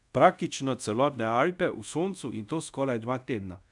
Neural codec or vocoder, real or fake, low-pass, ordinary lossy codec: codec, 24 kHz, 0.5 kbps, DualCodec; fake; 10.8 kHz; none